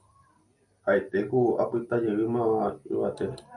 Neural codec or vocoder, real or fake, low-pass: none; real; 10.8 kHz